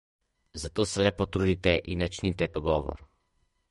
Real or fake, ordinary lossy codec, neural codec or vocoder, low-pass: fake; MP3, 48 kbps; codec, 32 kHz, 1.9 kbps, SNAC; 14.4 kHz